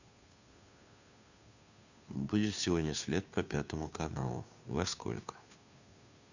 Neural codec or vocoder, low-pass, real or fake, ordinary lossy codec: codec, 16 kHz, 2 kbps, FunCodec, trained on Chinese and English, 25 frames a second; 7.2 kHz; fake; none